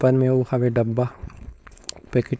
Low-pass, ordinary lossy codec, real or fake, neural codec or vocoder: none; none; fake; codec, 16 kHz, 4.8 kbps, FACodec